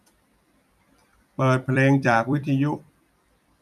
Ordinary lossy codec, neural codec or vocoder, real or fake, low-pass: none; vocoder, 44.1 kHz, 128 mel bands every 256 samples, BigVGAN v2; fake; 14.4 kHz